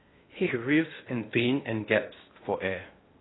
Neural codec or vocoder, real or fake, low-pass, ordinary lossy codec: codec, 16 kHz in and 24 kHz out, 0.8 kbps, FocalCodec, streaming, 65536 codes; fake; 7.2 kHz; AAC, 16 kbps